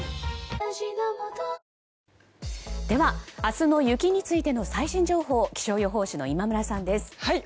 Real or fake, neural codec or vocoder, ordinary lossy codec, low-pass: real; none; none; none